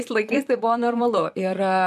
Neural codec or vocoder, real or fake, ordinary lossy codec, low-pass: vocoder, 44.1 kHz, 128 mel bands, Pupu-Vocoder; fake; MP3, 96 kbps; 14.4 kHz